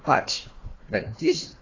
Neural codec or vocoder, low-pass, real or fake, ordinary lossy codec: codec, 16 kHz, 1 kbps, FunCodec, trained on Chinese and English, 50 frames a second; 7.2 kHz; fake; AAC, 32 kbps